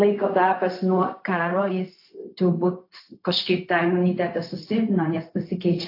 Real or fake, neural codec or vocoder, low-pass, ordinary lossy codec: fake; codec, 16 kHz, 0.4 kbps, LongCat-Audio-Codec; 5.4 kHz; AAC, 32 kbps